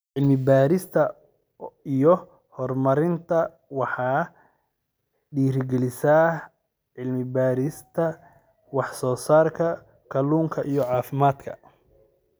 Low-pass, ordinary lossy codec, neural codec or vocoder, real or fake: none; none; none; real